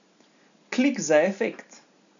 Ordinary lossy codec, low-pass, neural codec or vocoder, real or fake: none; 7.2 kHz; none; real